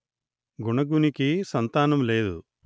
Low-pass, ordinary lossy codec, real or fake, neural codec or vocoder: none; none; real; none